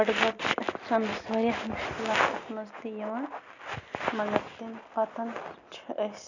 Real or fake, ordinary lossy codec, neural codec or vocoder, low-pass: real; none; none; 7.2 kHz